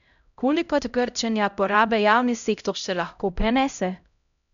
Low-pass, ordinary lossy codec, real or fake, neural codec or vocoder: 7.2 kHz; none; fake; codec, 16 kHz, 0.5 kbps, X-Codec, HuBERT features, trained on LibriSpeech